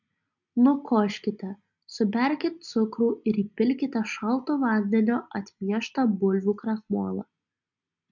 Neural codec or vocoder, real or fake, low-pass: none; real; 7.2 kHz